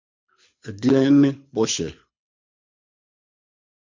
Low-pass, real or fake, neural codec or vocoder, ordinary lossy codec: 7.2 kHz; fake; codec, 24 kHz, 6 kbps, HILCodec; MP3, 64 kbps